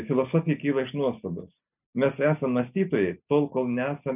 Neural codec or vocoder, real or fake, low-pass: none; real; 3.6 kHz